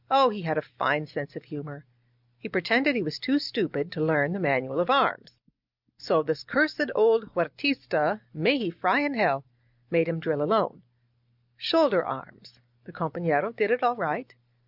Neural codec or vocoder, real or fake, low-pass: none; real; 5.4 kHz